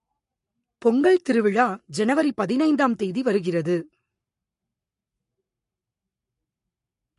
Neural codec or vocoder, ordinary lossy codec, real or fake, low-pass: codec, 44.1 kHz, 7.8 kbps, Pupu-Codec; MP3, 48 kbps; fake; 14.4 kHz